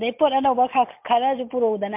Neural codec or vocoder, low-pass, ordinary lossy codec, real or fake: none; 3.6 kHz; MP3, 32 kbps; real